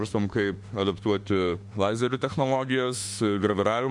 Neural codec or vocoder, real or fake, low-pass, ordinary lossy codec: autoencoder, 48 kHz, 32 numbers a frame, DAC-VAE, trained on Japanese speech; fake; 10.8 kHz; MP3, 64 kbps